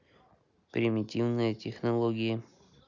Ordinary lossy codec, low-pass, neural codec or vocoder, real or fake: none; 7.2 kHz; none; real